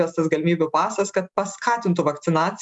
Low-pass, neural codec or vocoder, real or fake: 10.8 kHz; none; real